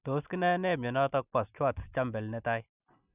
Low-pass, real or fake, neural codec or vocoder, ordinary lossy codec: 3.6 kHz; real; none; none